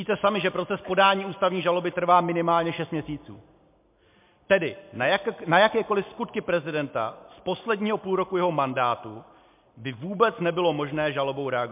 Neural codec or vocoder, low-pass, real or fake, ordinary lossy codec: none; 3.6 kHz; real; MP3, 32 kbps